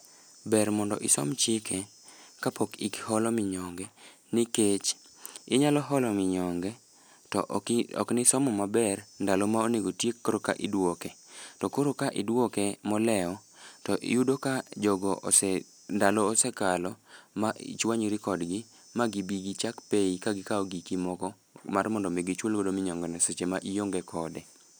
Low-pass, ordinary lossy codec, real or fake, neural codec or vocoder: none; none; real; none